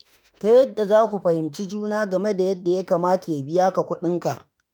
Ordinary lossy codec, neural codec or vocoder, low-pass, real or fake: none; autoencoder, 48 kHz, 32 numbers a frame, DAC-VAE, trained on Japanese speech; none; fake